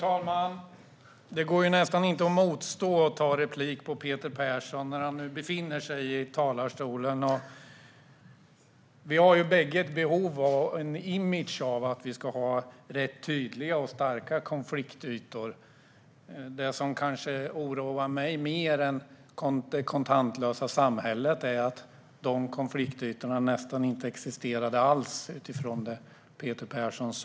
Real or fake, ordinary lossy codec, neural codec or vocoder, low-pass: real; none; none; none